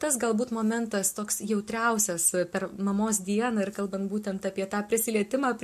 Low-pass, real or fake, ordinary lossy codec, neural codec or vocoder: 14.4 kHz; real; MP3, 64 kbps; none